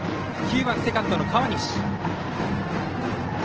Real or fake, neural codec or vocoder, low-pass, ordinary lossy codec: real; none; 7.2 kHz; Opus, 16 kbps